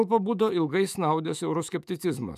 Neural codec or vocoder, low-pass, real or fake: autoencoder, 48 kHz, 128 numbers a frame, DAC-VAE, trained on Japanese speech; 14.4 kHz; fake